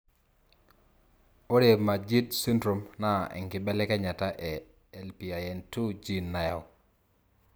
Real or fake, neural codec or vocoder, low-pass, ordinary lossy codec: real; none; none; none